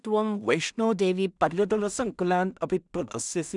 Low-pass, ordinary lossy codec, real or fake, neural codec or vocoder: 10.8 kHz; none; fake; codec, 16 kHz in and 24 kHz out, 0.4 kbps, LongCat-Audio-Codec, two codebook decoder